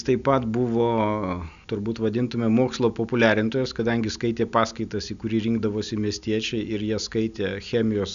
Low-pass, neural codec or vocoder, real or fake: 7.2 kHz; none; real